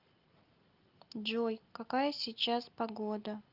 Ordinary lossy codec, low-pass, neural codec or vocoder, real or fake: Opus, 32 kbps; 5.4 kHz; none; real